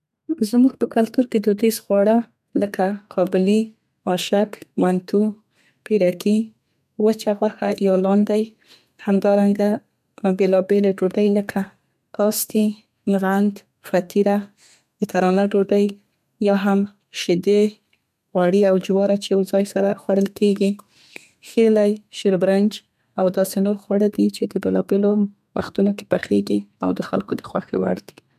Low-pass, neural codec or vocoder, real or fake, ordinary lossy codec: 14.4 kHz; codec, 32 kHz, 1.9 kbps, SNAC; fake; none